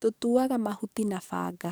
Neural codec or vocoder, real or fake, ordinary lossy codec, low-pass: codec, 44.1 kHz, 7.8 kbps, DAC; fake; none; none